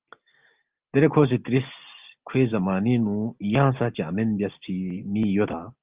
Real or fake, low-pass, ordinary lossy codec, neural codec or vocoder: real; 3.6 kHz; Opus, 24 kbps; none